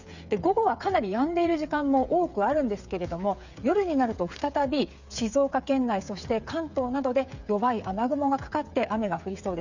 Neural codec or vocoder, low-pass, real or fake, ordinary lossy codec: codec, 16 kHz, 8 kbps, FreqCodec, smaller model; 7.2 kHz; fake; none